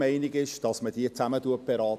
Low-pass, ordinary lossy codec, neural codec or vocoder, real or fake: 14.4 kHz; none; none; real